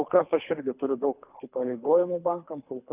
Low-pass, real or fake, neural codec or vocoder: 3.6 kHz; fake; codec, 24 kHz, 3 kbps, HILCodec